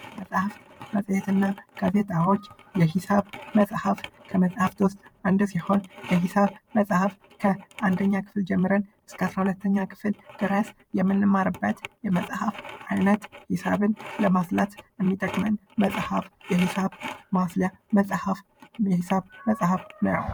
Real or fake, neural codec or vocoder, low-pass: fake; vocoder, 44.1 kHz, 128 mel bands every 512 samples, BigVGAN v2; 19.8 kHz